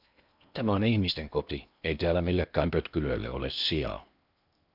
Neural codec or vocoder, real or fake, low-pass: codec, 16 kHz in and 24 kHz out, 0.8 kbps, FocalCodec, streaming, 65536 codes; fake; 5.4 kHz